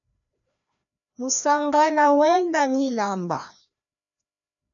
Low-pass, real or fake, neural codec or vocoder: 7.2 kHz; fake; codec, 16 kHz, 1 kbps, FreqCodec, larger model